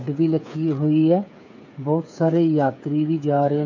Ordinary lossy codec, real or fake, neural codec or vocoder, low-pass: none; fake; codec, 16 kHz, 8 kbps, FreqCodec, smaller model; 7.2 kHz